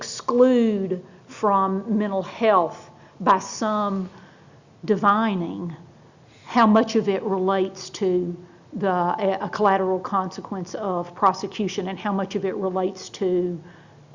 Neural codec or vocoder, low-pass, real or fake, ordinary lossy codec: none; 7.2 kHz; real; Opus, 64 kbps